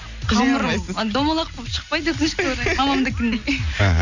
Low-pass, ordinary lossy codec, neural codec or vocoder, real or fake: 7.2 kHz; none; none; real